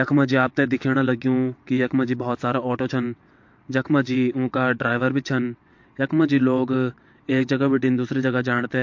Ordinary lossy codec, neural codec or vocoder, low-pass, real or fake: MP3, 48 kbps; vocoder, 22.05 kHz, 80 mel bands, WaveNeXt; 7.2 kHz; fake